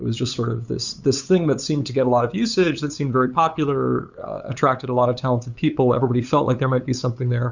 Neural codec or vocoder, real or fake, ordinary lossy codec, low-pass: codec, 16 kHz, 8 kbps, FunCodec, trained on LibriTTS, 25 frames a second; fake; Opus, 64 kbps; 7.2 kHz